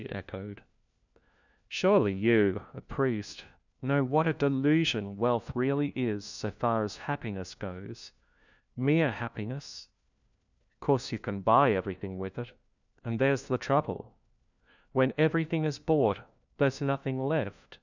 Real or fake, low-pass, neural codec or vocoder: fake; 7.2 kHz; codec, 16 kHz, 1 kbps, FunCodec, trained on LibriTTS, 50 frames a second